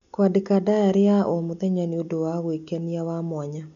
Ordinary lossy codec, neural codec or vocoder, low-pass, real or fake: none; none; 7.2 kHz; real